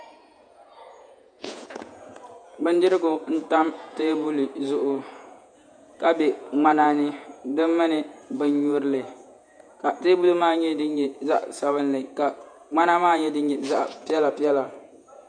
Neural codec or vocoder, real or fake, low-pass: vocoder, 48 kHz, 128 mel bands, Vocos; fake; 9.9 kHz